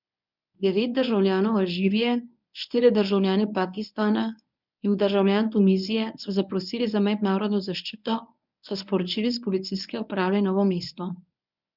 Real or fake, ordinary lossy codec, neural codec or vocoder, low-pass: fake; none; codec, 24 kHz, 0.9 kbps, WavTokenizer, medium speech release version 1; 5.4 kHz